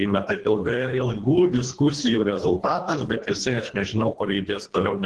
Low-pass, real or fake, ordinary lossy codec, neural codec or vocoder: 10.8 kHz; fake; Opus, 16 kbps; codec, 24 kHz, 1.5 kbps, HILCodec